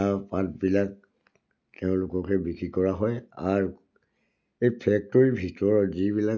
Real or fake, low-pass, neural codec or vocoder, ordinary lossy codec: real; 7.2 kHz; none; none